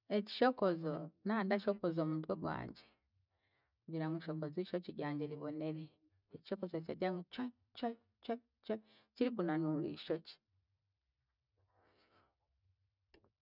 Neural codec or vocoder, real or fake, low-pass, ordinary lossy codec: none; real; 5.4 kHz; none